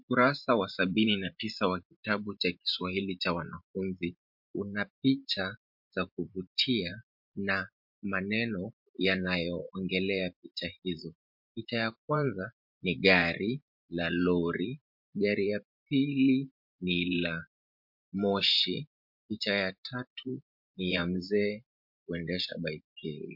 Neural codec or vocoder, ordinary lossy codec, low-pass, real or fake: vocoder, 44.1 kHz, 128 mel bands every 512 samples, BigVGAN v2; MP3, 48 kbps; 5.4 kHz; fake